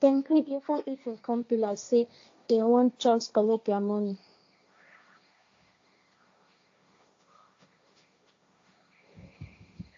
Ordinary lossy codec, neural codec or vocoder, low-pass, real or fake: AAC, 48 kbps; codec, 16 kHz, 1.1 kbps, Voila-Tokenizer; 7.2 kHz; fake